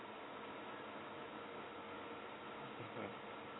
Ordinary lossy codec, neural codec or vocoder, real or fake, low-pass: AAC, 16 kbps; none; real; 7.2 kHz